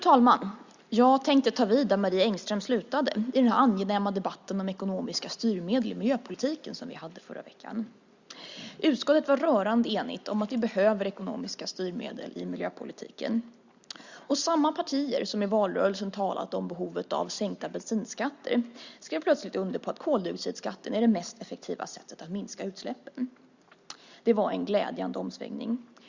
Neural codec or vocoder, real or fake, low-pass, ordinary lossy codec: none; real; 7.2 kHz; Opus, 64 kbps